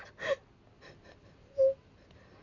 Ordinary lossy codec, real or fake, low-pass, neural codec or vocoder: none; real; 7.2 kHz; none